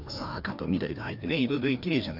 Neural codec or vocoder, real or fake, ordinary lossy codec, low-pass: codec, 16 kHz, 2 kbps, FreqCodec, larger model; fake; none; 5.4 kHz